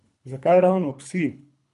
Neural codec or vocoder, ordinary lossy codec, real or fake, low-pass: codec, 24 kHz, 3 kbps, HILCodec; none; fake; 10.8 kHz